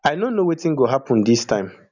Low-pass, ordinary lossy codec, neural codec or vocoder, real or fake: 7.2 kHz; none; none; real